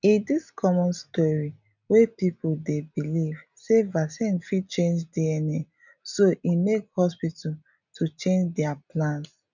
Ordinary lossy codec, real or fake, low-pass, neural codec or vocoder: none; real; 7.2 kHz; none